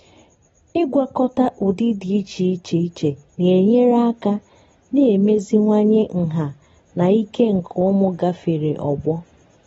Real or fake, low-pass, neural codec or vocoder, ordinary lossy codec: real; 10.8 kHz; none; AAC, 24 kbps